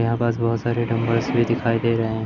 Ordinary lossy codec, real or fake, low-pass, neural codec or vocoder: none; real; 7.2 kHz; none